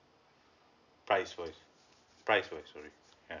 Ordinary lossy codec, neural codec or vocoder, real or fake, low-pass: none; none; real; 7.2 kHz